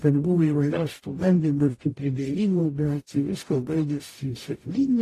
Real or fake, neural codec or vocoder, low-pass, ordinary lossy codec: fake; codec, 44.1 kHz, 0.9 kbps, DAC; 14.4 kHz; AAC, 48 kbps